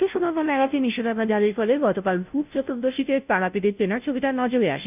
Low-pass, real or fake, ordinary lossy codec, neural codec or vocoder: 3.6 kHz; fake; none; codec, 16 kHz, 0.5 kbps, FunCodec, trained on Chinese and English, 25 frames a second